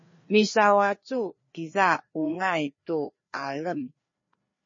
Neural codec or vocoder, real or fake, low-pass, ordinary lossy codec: codec, 16 kHz, 2 kbps, FreqCodec, larger model; fake; 7.2 kHz; MP3, 32 kbps